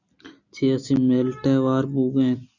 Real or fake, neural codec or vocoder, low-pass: real; none; 7.2 kHz